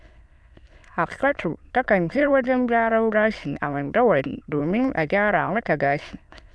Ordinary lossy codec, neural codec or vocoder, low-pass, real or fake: none; autoencoder, 22.05 kHz, a latent of 192 numbers a frame, VITS, trained on many speakers; none; fake